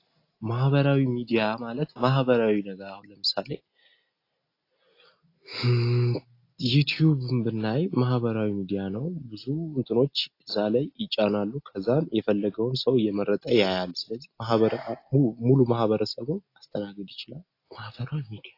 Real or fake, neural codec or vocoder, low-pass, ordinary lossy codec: real; none; 5.4 kHz; AAC, 32 kbps